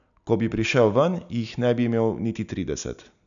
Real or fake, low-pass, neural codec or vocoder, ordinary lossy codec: real; 7.2 kHz; none; none